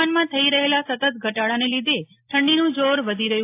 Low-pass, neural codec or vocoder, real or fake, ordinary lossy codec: 3.6 kHz; none; real; AAC, 24 kbps